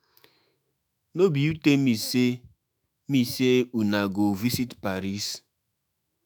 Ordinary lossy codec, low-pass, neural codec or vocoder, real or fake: none; none; autoencoder, 48 kHz, 128 numbers a frame, DAC-VAE, trained on Japanese speech; fake